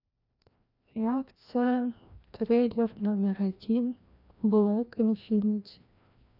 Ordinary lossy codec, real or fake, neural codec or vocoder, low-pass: none; fake; codec, 16 kHz, 1 kbps, FreqCodec, larger model; 5.4 kHz